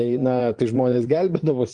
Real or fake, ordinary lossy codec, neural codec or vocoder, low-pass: fake; Opus, 24 kbps; vocoder, 22.05 kHz, 80 mel bands, Vocos; 9.9 kHz